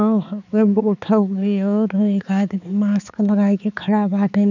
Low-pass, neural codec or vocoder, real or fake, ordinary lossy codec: 7.2 kHz; codec, 16 kHz, 4 kbps, X-Codec, HuBERT features, trained on balanced general audio; fake; none